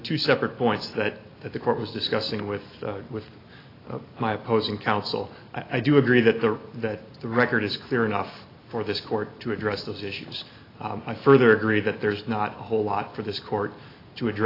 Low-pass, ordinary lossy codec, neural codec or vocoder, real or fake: 5.4 kHz; AAC, 24 kbps; none; real